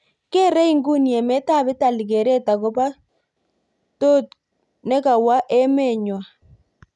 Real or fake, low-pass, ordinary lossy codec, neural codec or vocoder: real; 10.8 kHz; none; none